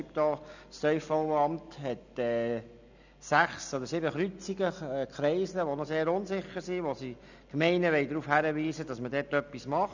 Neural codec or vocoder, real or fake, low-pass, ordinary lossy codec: none; real; 7.2 kHz; none